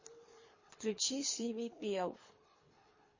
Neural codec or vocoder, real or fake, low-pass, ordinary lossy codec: codec, 24 kHz, 3 kbps, HILCodec; fake; 7.2 kHz; MP3, 32 kbps